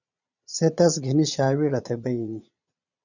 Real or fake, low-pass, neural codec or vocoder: fake; 7.2 kHz; vocoder, 22.05 kHz, 80 mel bands, Vocos